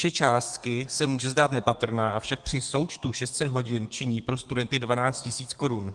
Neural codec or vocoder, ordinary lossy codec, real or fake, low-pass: codec, 32 kHz, 1.9 kbps, SNAC; Opus, 24 kbps; fake; 10.8 kHz